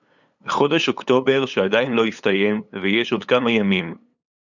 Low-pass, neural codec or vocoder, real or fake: 7.2 kHz; codec, 16 kHz, 8 kbps, FunCodec, trained on LibriTTS, 25 frames a second; fake